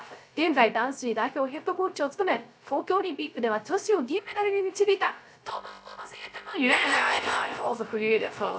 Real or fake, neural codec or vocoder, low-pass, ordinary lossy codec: fake; codec, 16 kHz, 0.3 kbps, FocalCodec; none; none